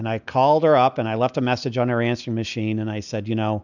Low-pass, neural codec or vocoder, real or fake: 7.2 kHz; none; real